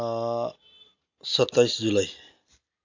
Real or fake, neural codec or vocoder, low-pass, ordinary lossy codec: real; none; 7.2 kHz; AAC, 48 kbps